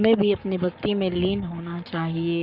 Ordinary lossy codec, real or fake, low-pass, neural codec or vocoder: Opus, 64 kbps; fake; 5.4 kHz; codec, 44.1 kHz, 7.8 kbps, Pupu-Codec